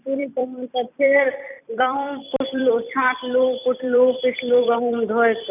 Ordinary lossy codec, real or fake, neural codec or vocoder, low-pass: none; fake; vocoder, 44.1 kHz, 128 mel bands every 256 samples, BigVGAN v2; 3.6 kHz